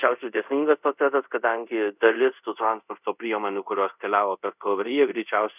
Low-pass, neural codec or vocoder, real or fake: 3.6 kHz; codec, 24 kHz, 0.5 kbps, DualCodec; fake